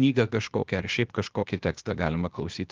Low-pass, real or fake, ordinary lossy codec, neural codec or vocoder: 7.2 kHz; fake; Opus, 32 kbps; codec, 16 kHz, 0.8 kbps, ZipCodec